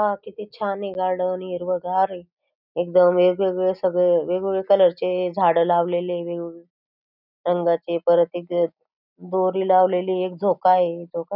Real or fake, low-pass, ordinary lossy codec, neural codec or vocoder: real; 5.4 kHz; none; none